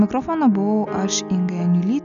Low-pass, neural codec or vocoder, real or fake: 7.2 kHz; none; real